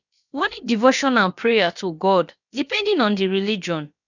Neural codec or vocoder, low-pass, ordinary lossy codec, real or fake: codec, 16 kHz, about 1 kbps, DyCAST, with the encoder's durations; 7.2 kHz; none; fake